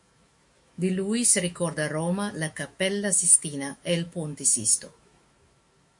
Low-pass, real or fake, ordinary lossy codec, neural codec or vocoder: 10.8 kHz; fake; MP3, 48 kbps; autoencoder, 48 kHz, 128 numbers a frame, DAC-VAE, trained on Japanese speech